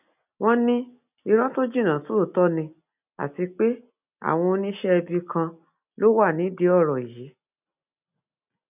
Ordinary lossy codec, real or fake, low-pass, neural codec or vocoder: none; real; 3.6 kHz; none